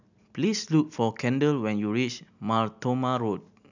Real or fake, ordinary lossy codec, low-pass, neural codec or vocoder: real; none; 7.2 kHz; none